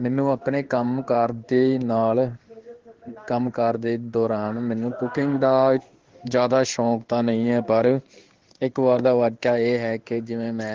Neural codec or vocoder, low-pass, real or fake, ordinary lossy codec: codec, 16 kHz in and 24 kHz out, 1 kbps, XY-Tokenizer; 7.2 kHz; fake; Opus, 16 kbps